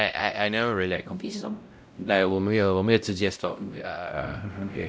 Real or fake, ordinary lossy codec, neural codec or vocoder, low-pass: fake; none; codec, 16 kHz, 0.5 kbps, X-Codec, WavLM features, trained on Multilingual LibriSpeech; none